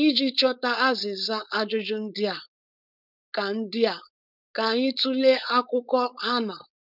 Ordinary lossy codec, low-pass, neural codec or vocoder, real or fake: none; 5.4 kHz; codec, 16 kHz, 4.8 kbps, FACodec; fake